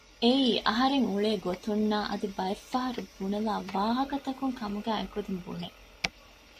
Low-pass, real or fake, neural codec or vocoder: 14.4 kHz; real; none